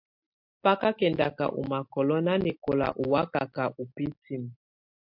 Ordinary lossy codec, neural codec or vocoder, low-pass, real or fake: MP3, 48 kbps; none; 5.4 kHz; real